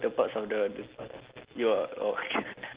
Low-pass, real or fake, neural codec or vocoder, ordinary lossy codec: 3.6 kHz; real; none; Opus, 16 kbps